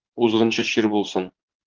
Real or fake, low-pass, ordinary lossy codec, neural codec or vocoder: fake; 7.2 kHz; Opus, 16 kbps; vocoder, 44.1 kHz, 80 mel bands, Vocos